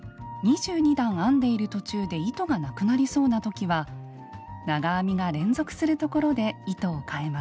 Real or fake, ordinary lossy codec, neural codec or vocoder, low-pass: real; none; none; none